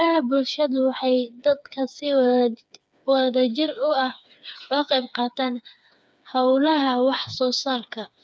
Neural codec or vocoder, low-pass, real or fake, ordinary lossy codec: codec, 16 kHz, 4 kbps, FreqCodec, smaller model; none; fake; none